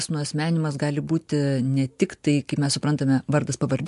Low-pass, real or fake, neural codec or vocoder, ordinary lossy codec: 10.8 kHz; real; none; MP3, 64 kbps